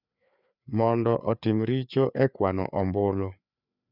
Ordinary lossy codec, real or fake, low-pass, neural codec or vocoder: none; fake; 5.4 kHz; codec, 16 kHz, 4 kbps, FreqCodec, larger model